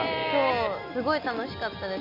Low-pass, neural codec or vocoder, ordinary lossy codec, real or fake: 5.4 kHz; none; AAC, 48 kbps; real